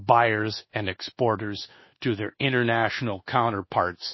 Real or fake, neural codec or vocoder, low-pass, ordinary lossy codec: fake; codec, 24 kHz, 1.2 kbps, DualCodec; 7.2 kHz; MP3, 24 kbps